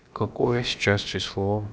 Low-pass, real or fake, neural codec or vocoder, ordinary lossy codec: none; fake; codec, 16 kHz, about 1 kbps, DyCAST, with the encoder's durations; none